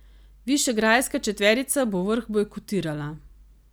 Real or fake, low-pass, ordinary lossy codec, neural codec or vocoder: real; none; none; none